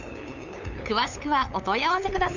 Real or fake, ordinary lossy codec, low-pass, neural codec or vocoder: fake; none; 7.2 kHz; codec, 16 kHz, 8 kbps, FunCodec, trained on LibriTTS, 25 frames a second